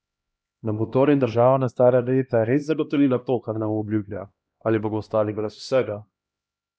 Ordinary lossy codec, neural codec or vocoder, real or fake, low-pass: none; codec, 16 kHz, 1 kbps, X-Codec, HuBERT features, trained on LibriSpeech; fake; none